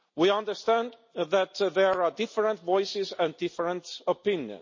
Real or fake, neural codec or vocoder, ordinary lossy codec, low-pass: real; none; MP3, 32 kbps; 7.2 kHz